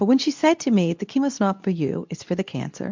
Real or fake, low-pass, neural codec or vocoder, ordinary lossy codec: fake; 7.2 kHz; codec, 24 kHz, 0.9 kbps, WavTokenizer, medium speech release version 2; MP3, 64 kbps